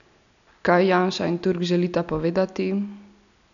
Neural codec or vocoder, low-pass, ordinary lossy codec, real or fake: none; 7.2 kHz; none; real